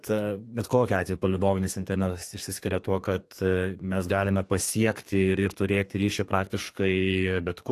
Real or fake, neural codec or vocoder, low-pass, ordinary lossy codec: fake; codec, 32 kHz, 1.9 kbps, SNAC; 14.4 kHz; AAC, 64 kbps